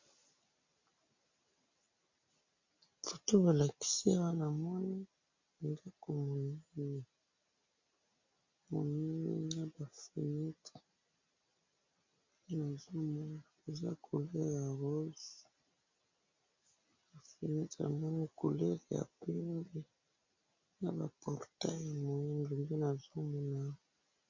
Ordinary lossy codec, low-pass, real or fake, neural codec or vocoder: MP3, 48 kbps; 7.2 kHz; real; none